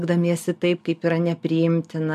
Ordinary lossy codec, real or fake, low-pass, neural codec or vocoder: MP3, 96 kbps; real; 14.4 kHz; none